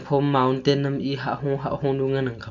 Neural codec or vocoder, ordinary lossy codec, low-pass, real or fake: none; none; 7.2 kHz; real